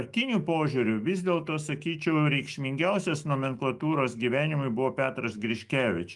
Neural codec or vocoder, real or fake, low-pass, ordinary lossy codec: autoencoder, 48 kHz, 128 numbers a frame, DAC-VAE, trained on Japanese speech; fake; 10.8 kHz; Opus, 32 kbps